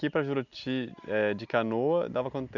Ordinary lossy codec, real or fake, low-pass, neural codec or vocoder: none; real; 7.2 kHz; none